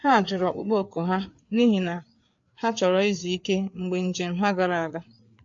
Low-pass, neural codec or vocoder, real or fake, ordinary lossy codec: 7.2 kHz; codec, 16 kHz, 4 kbps, FreqCodec, larger model; fake; MP3, 48 kbps